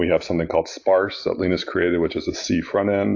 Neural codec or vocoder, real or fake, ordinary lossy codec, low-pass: none; real; AAC, 48 kbps; 7.2 kHz